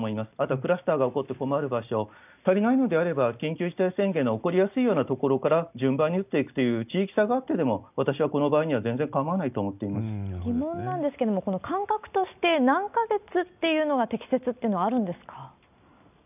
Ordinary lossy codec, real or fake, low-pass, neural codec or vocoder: none; real; 3.6 kHz; none